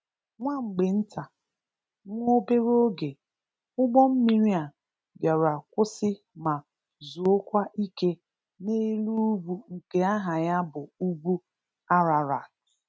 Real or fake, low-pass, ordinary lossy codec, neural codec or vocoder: real; none; none; none